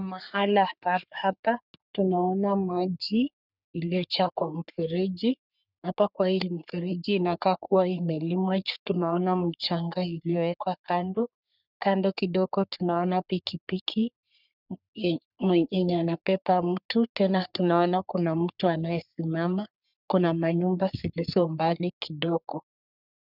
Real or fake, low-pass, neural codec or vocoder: fake; 5.4 kHz; codec, 44.1 kHz, 3.4 kbps, Pupu-Codec